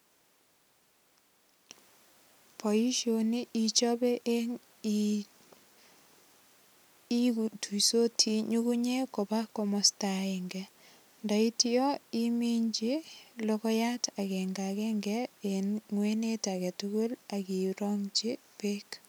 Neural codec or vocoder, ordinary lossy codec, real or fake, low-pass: none; none; real; none